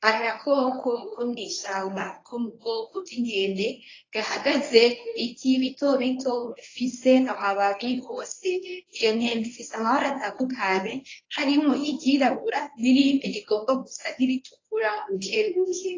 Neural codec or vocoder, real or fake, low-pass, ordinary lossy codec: codec, 24 kHz, 0.9 kbps, WavTokenizer, medium speech release version 1; fake; 7.2 kHz; AAC, 32 kbps